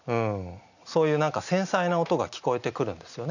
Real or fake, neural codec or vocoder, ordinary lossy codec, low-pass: real; none; none; 7.2 kHz